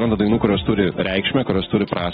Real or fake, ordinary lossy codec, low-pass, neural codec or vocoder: real; AAC, 16 kbps; 19.8 kHz; none